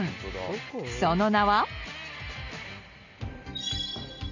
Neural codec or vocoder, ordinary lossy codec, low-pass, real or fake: none; none; 7.2 kHz; real